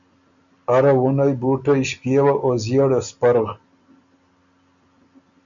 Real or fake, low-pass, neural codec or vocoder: real; 7.2 kHz; none